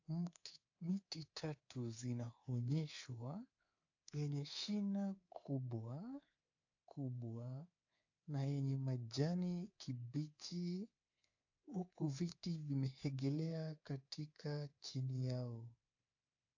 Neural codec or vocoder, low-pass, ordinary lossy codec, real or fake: codec, 24 kHz, 3.1 kbps, DualCodec; 7.2 kHz; AAC, 48 kbps; fake